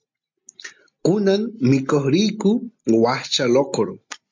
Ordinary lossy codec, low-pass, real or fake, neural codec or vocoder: MP3, 64 kbps; 7.2 kHz; real; none